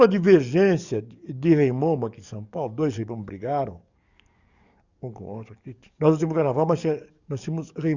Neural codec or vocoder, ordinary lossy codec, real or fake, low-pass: codec, 44.1 kHz, 7.8 kbps, DAC; Opus, 64 kbps; fake; 7.2 kHz